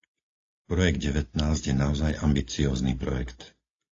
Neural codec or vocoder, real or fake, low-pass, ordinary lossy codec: none; real; 7.2 kHz; AAC, 32 kbps